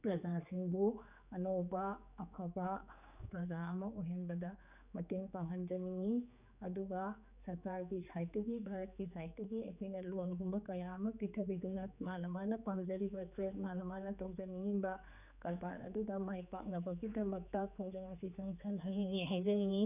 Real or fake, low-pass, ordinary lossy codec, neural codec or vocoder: fake; 3.6 kHz; none; codec, 16 kHz, 4 kbps, X-Codec, HuBERT features, trained on general audio